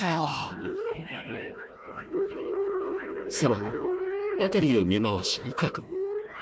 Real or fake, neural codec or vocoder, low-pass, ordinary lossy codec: fake; codec, 16 kHz, 1 kbps, FunCodec, trained on Chinese and English, 50 frames a second; none; none